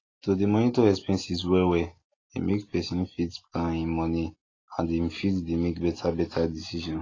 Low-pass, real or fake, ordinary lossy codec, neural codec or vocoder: 7.2 kHz; real; AAC, 32 kbps; none